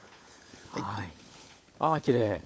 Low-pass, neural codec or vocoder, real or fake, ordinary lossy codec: none; codec, 16 kHz, 16 kbps, FunCodec, trained on LibriTTS, 50 frames a second; fake; none